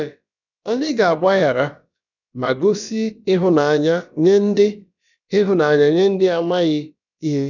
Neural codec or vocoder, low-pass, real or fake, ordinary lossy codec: codec, 16 kHz, about 1 kbps, DyCAST, with the encoder's durations; 7.2 kHz; fake; none